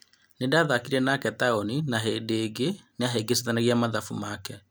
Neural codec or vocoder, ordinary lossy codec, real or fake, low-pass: none; none; real; none